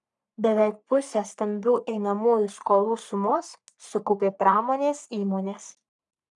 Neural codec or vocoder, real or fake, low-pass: codec, 44.1 kHz, 3.4 kbps, Pupu-Codec; fake; 10.8 kHz